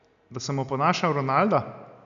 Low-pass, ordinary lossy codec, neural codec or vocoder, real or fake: 7.2 kHz; none; none; real